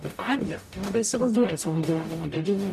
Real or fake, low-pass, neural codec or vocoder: fake; 14.4 kHz; codec, 44.1 kHz, 0.9 kbps, DAC